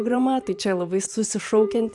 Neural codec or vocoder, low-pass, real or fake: vocoder, 44.1 kHz, 128 mel bands every 512 samples, BigVGAN v2; 10.8 kHz; fake